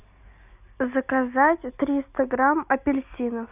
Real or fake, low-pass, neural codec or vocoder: real; 3.6 kHz; none